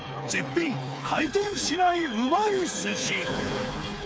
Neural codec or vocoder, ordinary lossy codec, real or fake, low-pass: codec, 16 kHz, 4 kbps, FreqCodec, smaller model; none; fake; none